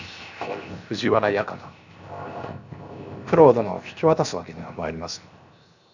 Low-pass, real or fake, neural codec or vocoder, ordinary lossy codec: 7.2 kHz; fake; codec, 16 kHz, 0.7 kbps, FocalCodec; none